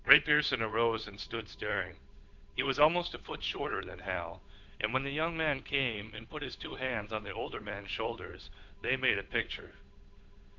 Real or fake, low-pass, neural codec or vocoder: fake; 7.2 kHz; codec, 16 kHz, 8 kbps, FunCodec, trained on Chinese and English, 25 frames a second